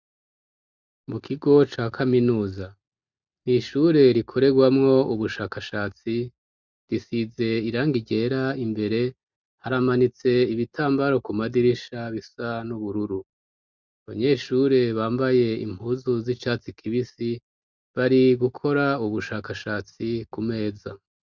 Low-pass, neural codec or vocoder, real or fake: 7.2 kHz; none; real